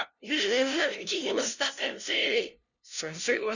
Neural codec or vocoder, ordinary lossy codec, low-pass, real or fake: codec, 16 kHz, 0.5 kbps, FunCodec, trained on LibriTTS, 25 frames a second; none; 7.2 kHz; fake